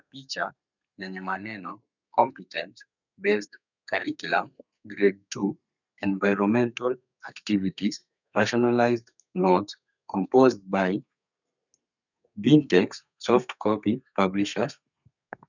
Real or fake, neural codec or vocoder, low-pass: fake; codec, 32 kHz, 1.9 kbps, SNAC; 7.2 kHz